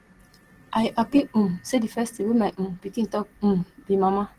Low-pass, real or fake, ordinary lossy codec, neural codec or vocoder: 14.4 kHz; real; Opus, 16 kbps; none